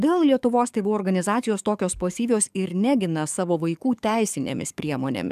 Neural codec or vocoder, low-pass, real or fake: codec, 44.1 kHz, 7.8 kbps, DAC; 14.4 kHz; fake